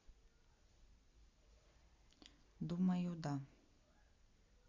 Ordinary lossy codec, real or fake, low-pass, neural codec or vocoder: Opus, 64 kbps; real; 7.2 kHz; none